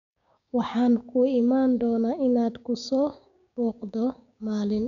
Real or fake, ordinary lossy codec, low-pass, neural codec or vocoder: fake; none; 7.2 kHz; codec, 16 kHz, 6 kbps, DAC